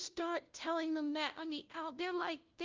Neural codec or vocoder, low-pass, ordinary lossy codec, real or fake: codec, 16 kHz, 0.5 kbps, FunCodec, trained on LibriTTS, 25 frames a second; 7.2 kHz; Opus, 24 kbps; fake